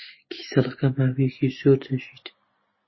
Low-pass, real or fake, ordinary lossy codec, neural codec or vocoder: 7.2 kHz; real; MP3, 24 kbps; none